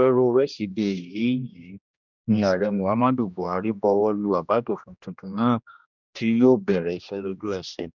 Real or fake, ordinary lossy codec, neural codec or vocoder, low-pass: fake; none; codec, 16 kHz, 1 kbps, X-Codec, HuBERT features, trained on general audio; 7.2 kHz